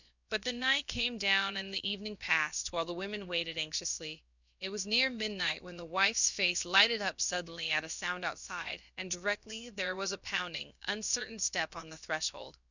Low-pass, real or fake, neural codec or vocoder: 7.2 kHz; fake; codec, 16 kHz, about 1 kbps, DyCAST, with the encoder's durations